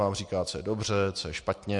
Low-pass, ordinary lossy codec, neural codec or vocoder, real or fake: 10.8 kHz; MP3, 48 kbps; none; real